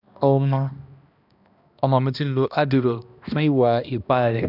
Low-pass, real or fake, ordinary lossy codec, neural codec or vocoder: 5.4 kHz; fake; none; codec, 16 kHz, 1 kbps, X-Codec, HuBERT features, trained on balanced general audio